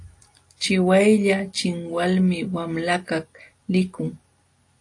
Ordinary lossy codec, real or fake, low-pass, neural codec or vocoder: AAC, 48 kbps; real; 10.8 kHz; none